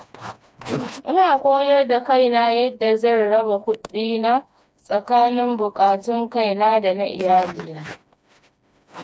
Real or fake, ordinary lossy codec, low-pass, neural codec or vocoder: fake; none; none; codec, 16 kHz, 2 kbps, FreqCodec, smaller model